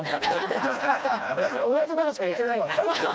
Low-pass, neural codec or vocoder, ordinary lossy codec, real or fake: none; codec, 16 kHz, 1 kbps, FreqCodec, smaller model; none; fake